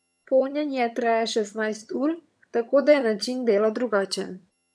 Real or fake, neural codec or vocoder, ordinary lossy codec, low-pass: fake; vocoder, 22.05 kHz, 80 mel bands, HiFi-GAN; none; none